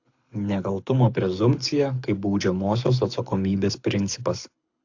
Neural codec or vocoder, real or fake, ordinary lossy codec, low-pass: codec, 24 kHz, 6 kbps, HILCodec; fake; AAC, 48 kbps; 7.2 kHz